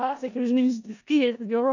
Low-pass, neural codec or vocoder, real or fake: 7.2 kHz; codec, 16 kHz in and 24 kHz out, 0.4 kbps, LongCat-Audio-Codec, four codebook decoder; fake